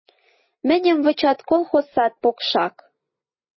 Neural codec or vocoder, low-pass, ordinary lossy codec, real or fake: none; 7.2 kHz; MP3, 24 kbps; real